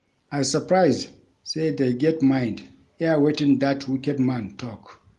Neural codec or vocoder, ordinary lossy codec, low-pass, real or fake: none; Opus, 24 kbps; 10.8 kHz; real